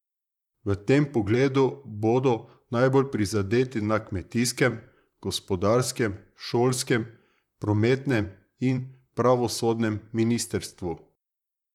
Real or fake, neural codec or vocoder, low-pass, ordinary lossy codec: fake; vocoder, 44.1 kHz, 128 mel bands, Pupu-Vocoder; 19.8 kHz; none